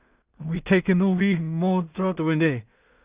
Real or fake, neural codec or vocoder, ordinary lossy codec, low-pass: fake; codec, 16 kHz in and 24 kHz out, 0.4 kbps, LongCat-Audio-Codec, two codebook decoder; Opus, 64 kbps; 3.6 kHz